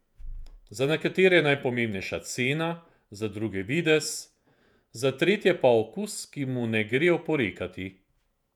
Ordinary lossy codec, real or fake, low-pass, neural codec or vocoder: none; fake; 19.8 kHz; vocoder, 48 kHz, 128 mel bands, Vocos